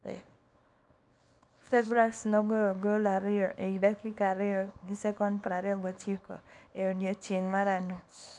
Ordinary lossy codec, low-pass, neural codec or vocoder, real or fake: none; 10.8 kHz; codec, 24 kHz, 0.9 kbps, WavTokenizer, small release; fake